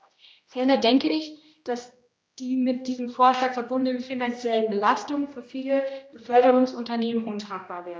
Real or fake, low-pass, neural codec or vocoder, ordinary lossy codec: fake; none; codec, 16 kHz, 1 kbps, X-Codec, HuBERT features, trained on general audio; none